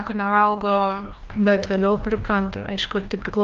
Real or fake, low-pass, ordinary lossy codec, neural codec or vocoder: fake; 7.2 kHz; Opus, 32 kbps; codec, 16 kHz, 1 kbps, FreqCodec, larger model